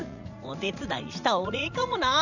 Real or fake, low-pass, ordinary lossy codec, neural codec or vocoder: fake; 7.2 kHz; none; vocoder, 44.1 kHz, 80 mel bands, Vocos